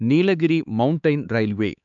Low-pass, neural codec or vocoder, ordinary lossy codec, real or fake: 7.2 kHz; codec, 16 kHz, 4 kbps, X-Codec, HuBERT features, trained on LibriSpeech; none; fake